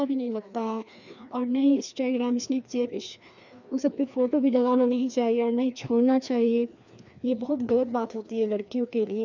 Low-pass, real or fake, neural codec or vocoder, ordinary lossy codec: 7.2 kHz; fake; codec, 16 kHz, 2 kbps, FreqCodec, larger model; none